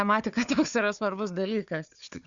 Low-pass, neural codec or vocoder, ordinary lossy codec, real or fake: 7.2 kHz; codec, 16 kHz, 4 kbps, FunCodec, trained on Chinese and English, 50 frames a second; Opus, 64 kbps; fake